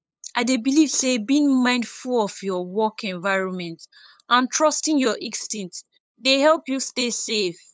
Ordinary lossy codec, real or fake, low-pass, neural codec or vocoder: none; fake; none; codec, 16 kHz, 8 kbps, FunCodec, trained on LibriTTS, 25 frames a second